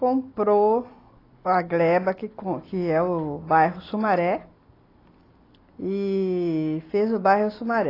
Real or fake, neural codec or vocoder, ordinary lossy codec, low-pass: real; none; AAC, 24 kbps; 5.4 kHz